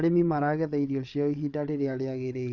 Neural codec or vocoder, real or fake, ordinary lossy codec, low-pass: codec, 16 kHz, 8 kbps, FreqCodec, larger model; fake; none; 7.2 kHz